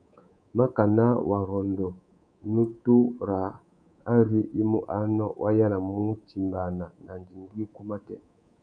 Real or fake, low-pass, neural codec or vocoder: fake; 9.9 kHz; codec, 24 kHz, 3.1 kbps, DualCodec